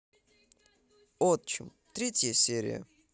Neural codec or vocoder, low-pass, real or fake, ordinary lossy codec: none; none; real; none